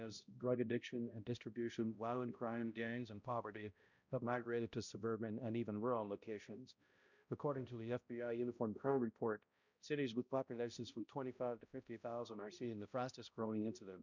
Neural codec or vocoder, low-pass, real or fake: codec, 16 kHz, 0.5 kbps, X-Codec, HuBERT features, trained on balanced general audio; 7.2 kHz; fake